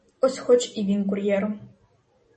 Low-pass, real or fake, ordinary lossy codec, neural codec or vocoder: 10.8 kHz; real; MP3, 32 kbps; none